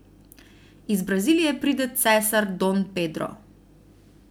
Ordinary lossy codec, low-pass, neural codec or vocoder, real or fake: none; none; none; real